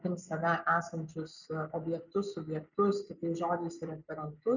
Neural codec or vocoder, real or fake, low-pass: none; real; 7.2 kHz